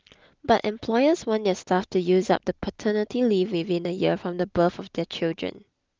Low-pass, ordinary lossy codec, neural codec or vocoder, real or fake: 7.2 kHz; Opus, 32 kbps; none; real